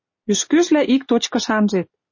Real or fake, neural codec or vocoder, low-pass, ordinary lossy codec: real; none; 7.2 kHz; MP3, 32 kbps